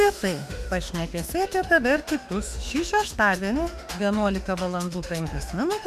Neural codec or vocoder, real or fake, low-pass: autoencoder, 48 kHz, 32 numbers a frame, DAC-VAE, trained on Japanese speech; fake; 14.4 kHz